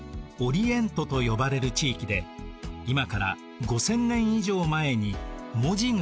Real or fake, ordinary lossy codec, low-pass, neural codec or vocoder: real; none; none; none